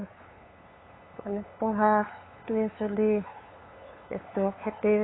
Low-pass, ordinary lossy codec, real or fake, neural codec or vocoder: 7.2 kHz; AAC, 16 kbps; fake; codec, 16 kHz, 8 kbps, FunCodec, trained on LibriTTS, 25 frames a second